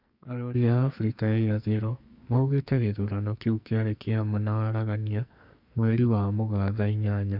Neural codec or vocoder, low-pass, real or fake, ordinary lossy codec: codec, 44.1 kHz, 2.6 kbps, SNAC; 5.4 kHz; fake; none